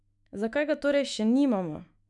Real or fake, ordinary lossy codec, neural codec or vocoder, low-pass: fake; none; autoencoder, 48 kHz, 128 numbers a frame, DAC-VAE, trained on Japanese speech; 10.8 kHz